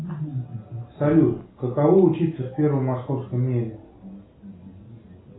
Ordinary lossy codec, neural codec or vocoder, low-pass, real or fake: AAC, 16 kbps; none; 7.2 kHz; real